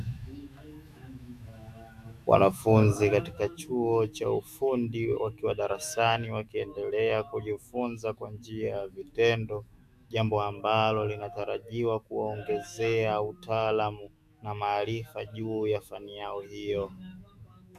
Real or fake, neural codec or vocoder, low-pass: fake; autoencoder, 48 kHz, 128 numbers a frame, DAC-VAE, trained on Japanese speech; 14.4 kHz